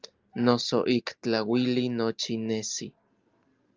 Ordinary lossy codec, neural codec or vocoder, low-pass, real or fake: Opus, 32 kbps; none; 7.2 kHz; real